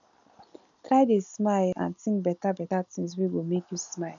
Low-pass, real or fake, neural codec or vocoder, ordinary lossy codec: 7.2 kHz; real; none; none